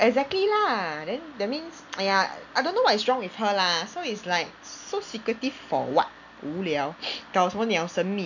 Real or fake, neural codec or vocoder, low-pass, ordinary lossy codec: real; none; 7.2 kHz; none